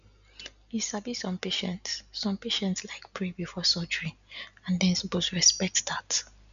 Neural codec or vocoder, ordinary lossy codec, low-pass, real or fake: none; none; 7.2 kHz; real